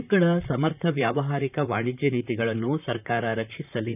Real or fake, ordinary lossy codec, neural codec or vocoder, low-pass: fake; none; vocoder, 44.1 kHz, 128 mel bands, Pupu-Vocoder; 3.6 kHz